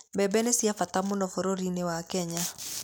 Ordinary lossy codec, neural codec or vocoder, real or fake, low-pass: none; none; real; none